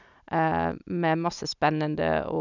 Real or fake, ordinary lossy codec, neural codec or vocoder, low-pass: real; none; none; 7.2 kHz